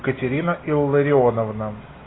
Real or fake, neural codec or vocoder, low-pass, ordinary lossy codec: real; none; 7.2 kHz; AAC, 16 kbps